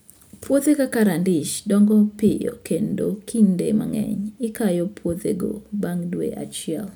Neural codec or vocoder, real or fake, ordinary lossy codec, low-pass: none; real; none; none